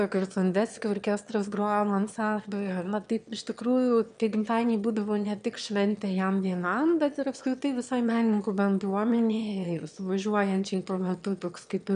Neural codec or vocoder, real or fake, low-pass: autoencoder, 22.05 kHz, a latent of 192 numbers a frame, VITS, trained on one speaker; fake; 9.9 kHz